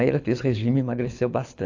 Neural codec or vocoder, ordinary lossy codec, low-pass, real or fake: codec, 24 kHz, 6 kbps, HILCodec; AAC, 48 kbps; 7.2 kHz; fake